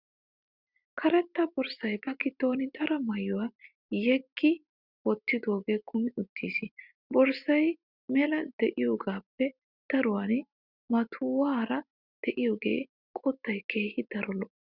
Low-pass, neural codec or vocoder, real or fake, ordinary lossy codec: 5.4 kHz; none; real; Opus, 64 kbps